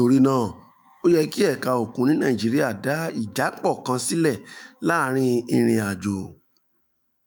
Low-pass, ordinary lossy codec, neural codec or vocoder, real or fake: none; none; autoencoder, 48 kHz, 128 numbers a frame, DAC-VAE, trained on Japanese speech; fake